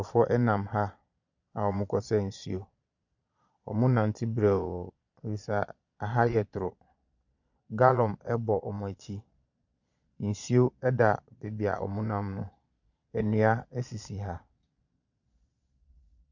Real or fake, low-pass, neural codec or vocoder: fake; 7.2 kHz; vocoder, 22.05 kHz, 80 mel bands, Vocos